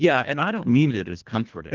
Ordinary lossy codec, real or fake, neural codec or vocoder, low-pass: Opus, 24 kbps; fake; codec, 24 kHz, 1.5 kbps, HILCodec; 7.2 kHz